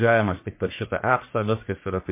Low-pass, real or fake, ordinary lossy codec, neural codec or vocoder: 3.6 kHz; fake; MP3, 24 kbps; codec, 44.1 kHz, 1.7 kbps, Pupu-Codec